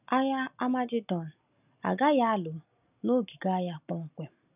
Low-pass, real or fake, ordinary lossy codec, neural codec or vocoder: 3.6 kHz; real; none; none